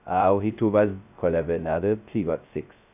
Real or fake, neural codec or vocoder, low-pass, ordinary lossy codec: fake; codec, 16 kHz, 0.2 kbps, FocalCodec; 3.6 kHz; none